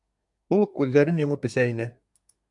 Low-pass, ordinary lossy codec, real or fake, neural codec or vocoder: 10.8 kHz; MP3, 64 kbps; fake; codec, 24 kHz, 1 kbps, SNAC